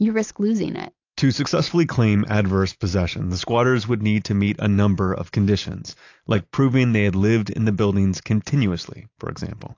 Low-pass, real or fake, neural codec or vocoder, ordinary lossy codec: 7.2 kHz; real; none; AAC, 48 kbps